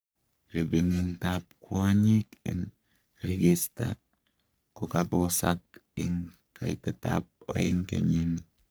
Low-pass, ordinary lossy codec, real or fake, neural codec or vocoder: none; none; fake; codec, 44.1 kHz, 3.4 kbps, Pupu-Codec